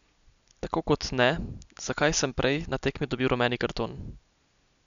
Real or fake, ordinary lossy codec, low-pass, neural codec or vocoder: real; none; 7.2 kHz; none